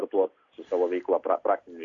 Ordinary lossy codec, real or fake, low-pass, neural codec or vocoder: Opus, 64 kbps; real; 7.2 kHz; none